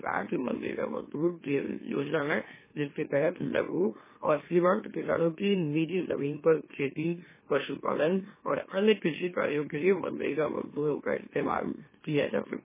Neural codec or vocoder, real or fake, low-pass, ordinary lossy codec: autoencoder, 44.1 kHz, a latent of 192 numbers a frame, MeloTTS; fake; 3.6 kHz; MP3, 16 kbps